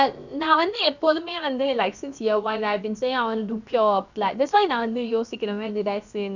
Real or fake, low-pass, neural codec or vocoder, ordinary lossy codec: fake; 7.2 kHz; codec, 16 kHz, about 1 kbps, DyCAST, with the encoder's durations; none